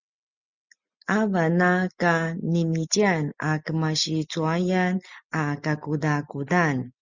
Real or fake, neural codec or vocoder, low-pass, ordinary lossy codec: real; none; 7.2 kHz; Opus, 64 kbps